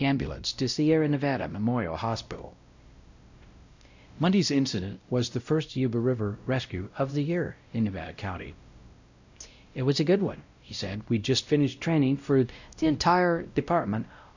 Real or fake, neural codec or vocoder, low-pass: fake; codec, 16 kHz, 0.5 kbps, X-Codec, WavLM features, trained on Multilingual LibriSpeech; 7.2 kHz